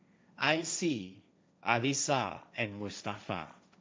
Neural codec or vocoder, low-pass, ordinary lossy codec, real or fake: codec, 16 kHz, 1.1 kbps, Voila-Tokenizer; none; none; fake